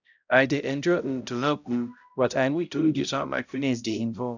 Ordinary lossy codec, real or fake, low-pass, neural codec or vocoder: none; fake; 7.2 kHz; codec, 16 kHz, 0.5 kbps, X-Codec, HuBERT features, trained on balanced general audio